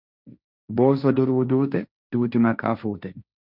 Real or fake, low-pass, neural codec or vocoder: fake; 5.4 kHz; codec, 16 kHz, 1.1 kbps, Voila-Tokenizer